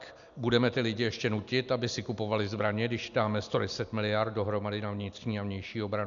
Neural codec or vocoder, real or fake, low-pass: none; real; 7.2 kHz